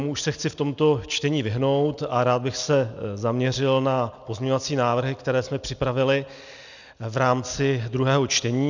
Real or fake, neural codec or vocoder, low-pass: real; none; 7.2 kHz